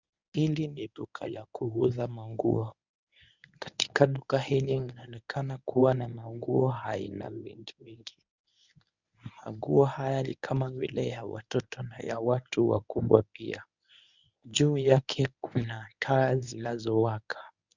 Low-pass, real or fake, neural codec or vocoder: 7.2 kHz; fake; codec, 24 kHz, 0.9 kbps, WavTokenizer, medium speech release version 1